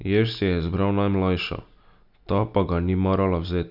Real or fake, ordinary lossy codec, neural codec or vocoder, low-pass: real; none; none; 5.4 kHz